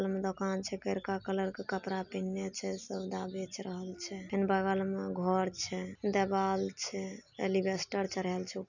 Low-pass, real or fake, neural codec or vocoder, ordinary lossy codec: 7.2 kHz; real; none; none